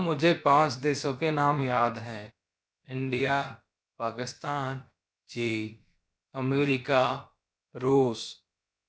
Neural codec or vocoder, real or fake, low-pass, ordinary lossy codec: codec, 16 kHz, about 1 kbps, DyCAST, with the encoder's durations; fake; none; none